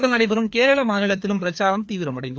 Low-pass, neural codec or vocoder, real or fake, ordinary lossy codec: none; codec, 16 kHz, 2 kbps, FreqCodec, larger model; fake; none